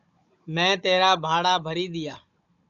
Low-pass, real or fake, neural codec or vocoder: 7.2 kHz; fake; codec, 16 kHz, 16 kbps, FunCodec, trained on Chinese and English, 50 frames a second